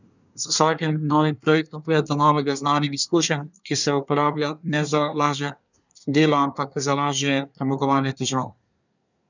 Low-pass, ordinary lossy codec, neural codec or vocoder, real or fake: 7.2 kHz; none; codec, 24 kHz, 1 kbps, SNAC; fake